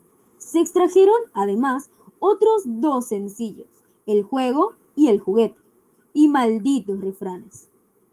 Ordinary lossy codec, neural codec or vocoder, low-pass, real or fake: Opus, 32 kbps; autoencoder, 48 kHz, 128 numbers a frame, DAC-VAE, trained on Japanese speech; 14.4 kHz; fake